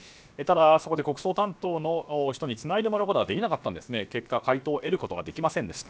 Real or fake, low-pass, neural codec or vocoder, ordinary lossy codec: fake; none; codec, 16 kHz, about 1 kbps, DyCAST, with the encoder's durations; none